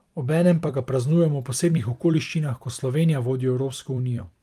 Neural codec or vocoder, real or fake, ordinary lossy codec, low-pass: vocoder, 44.1 kHz, 128 mel bands every 256 samples, BigVGAN v2; fake; Opus, 32 kbps; 14.4 kHz